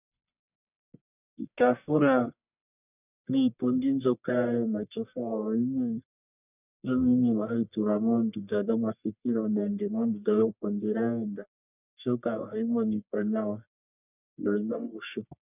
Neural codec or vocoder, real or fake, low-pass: codec, 44.1 kHz, 1.7 kbps, Pupu-Codec; fake; 3.6 kHz